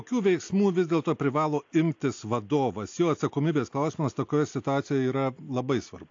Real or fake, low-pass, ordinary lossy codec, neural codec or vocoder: real; 7.2 kHz; AAC, 48 kbps; none